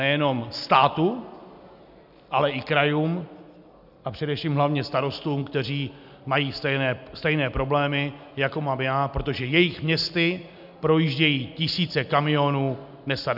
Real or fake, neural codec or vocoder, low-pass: real; none; 5.4 kHz